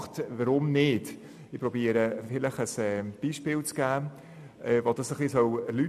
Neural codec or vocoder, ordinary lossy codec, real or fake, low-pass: none; none; real; 14.4 kHz